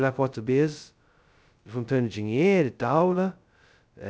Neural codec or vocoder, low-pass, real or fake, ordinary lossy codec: codec, 16 kHz, 0.2 kbps, FocalCodec; none; fake; none